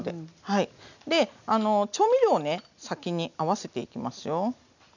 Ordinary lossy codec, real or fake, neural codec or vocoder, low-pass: none; real; none; 7.2 kHz